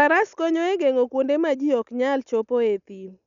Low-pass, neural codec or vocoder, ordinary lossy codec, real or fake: 7.2 kHz; none; none; real